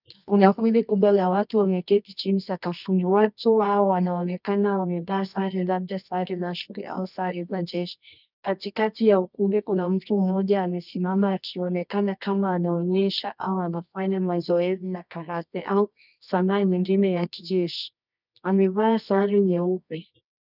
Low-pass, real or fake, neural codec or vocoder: 5.4 kHz; fake; codec, 24 kHz, 0.9 kbps, WavTokenizer, medium music audio release